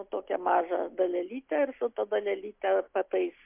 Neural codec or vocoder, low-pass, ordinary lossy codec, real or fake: none; 3.6 kHz; MP3, 32 kbps; real